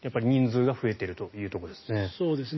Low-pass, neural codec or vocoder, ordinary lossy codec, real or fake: 7.2 kHz; none; MP3, 24 kbps; real